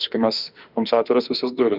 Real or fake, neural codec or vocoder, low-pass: fake; autoencoder, 48 kHz, 32 numbers a frame, DAC-VAE, trained on Japanese speech; 5.4 kHz